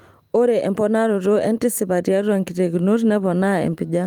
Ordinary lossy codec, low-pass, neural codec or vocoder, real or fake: Opus, 32 kbps; 19.8 kHz; vocoder, 44.1 kHz, 128 mel bands every 512 samples, BigVGAN v2; fake